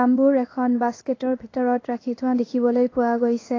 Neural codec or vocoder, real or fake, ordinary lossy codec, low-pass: codec, 16 kHz in and 24 kHz out, 1 kbps, XY-Tokenizer; fake; AAC, 32 kbps; 7.2 kHz